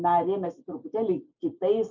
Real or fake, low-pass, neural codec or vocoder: real; 7.2 kHz; none